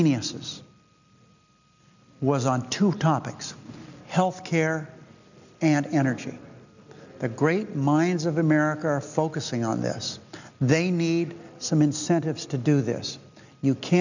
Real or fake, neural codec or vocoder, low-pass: real; none; 7.2 kHz